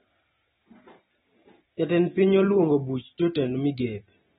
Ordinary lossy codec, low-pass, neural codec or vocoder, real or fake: AAC, 16 kbps; 7.2 kHz; none; real